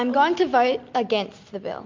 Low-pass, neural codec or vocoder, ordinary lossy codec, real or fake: 7.2 kHz; none; MP3, 48 kbps; real